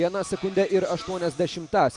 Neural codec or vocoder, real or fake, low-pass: none; real; 10.8 kHz